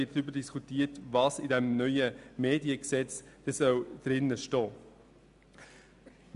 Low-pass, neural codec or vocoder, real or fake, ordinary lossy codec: 10.8 kHz; none; real; AAC, 96 kbps